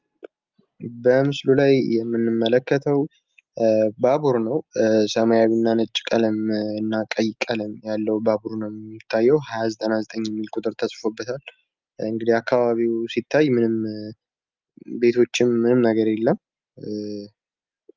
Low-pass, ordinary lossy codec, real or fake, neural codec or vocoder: 7.2 kHz; Opus, 24 kbps; real; none